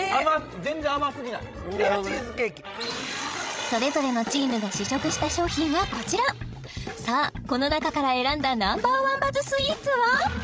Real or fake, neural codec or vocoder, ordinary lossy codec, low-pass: fake; codec, 16 kHz, 16 kbps, FreqCodec, larger model; none; none